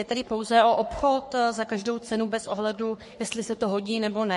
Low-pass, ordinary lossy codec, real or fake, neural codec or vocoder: 14.4 kHz; MP3, 48 kbps; fake; codec, 44.1 kHz, 3.4 kbps, Pupu-Codec